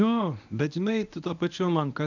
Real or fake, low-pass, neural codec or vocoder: fake; 7.2 kHz; codec, 24 kHz, 0.9 kbps, WavTokenizer, medium speech release version 1